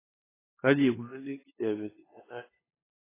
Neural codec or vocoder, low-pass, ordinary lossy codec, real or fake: codec, 16 kHz, 8 kbps, FunCodec, trained on LibriTTS, 25 frames a second; 3.6 kHz; AAC, 16 kbps; fake